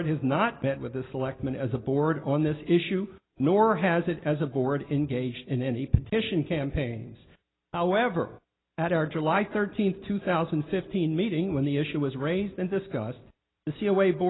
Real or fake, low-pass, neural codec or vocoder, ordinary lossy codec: real; 7.2 kHz; none; AAC, 16 kbps